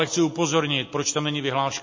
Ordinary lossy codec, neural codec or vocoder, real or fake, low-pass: MP3, 32 kbps; none; real; 7.2 kHz